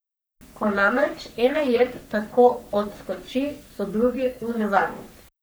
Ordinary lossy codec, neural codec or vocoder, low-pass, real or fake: none; codec, 44.1 kHz, 3.4 kbps, Pupu-Codec; none; fake